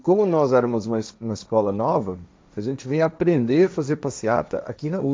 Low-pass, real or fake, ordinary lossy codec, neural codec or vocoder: none; fake; none; codec, 16 kHz, 1.1 kbps, Voila-Tokenizer